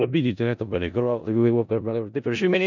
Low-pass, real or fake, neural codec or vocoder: 7.2 kHz; fake; codec, 16 kHz in and 24 kHz out, 0.4 kbps, LongCat-Audio-Codec, four codebook decoder